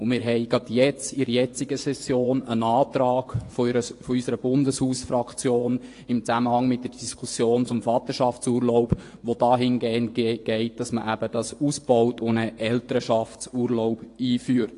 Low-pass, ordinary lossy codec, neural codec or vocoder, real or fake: 10.8 kHz; AAC, 48 kbps; vocoder, 24 kHz, 100 mel bands, Vocos; fake